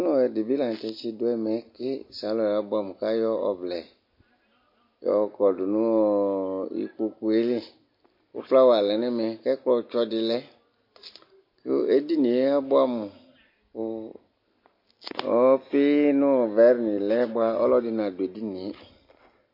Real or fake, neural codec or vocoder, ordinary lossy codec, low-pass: real; none; MP3, 32 kbps; 5.4 kHz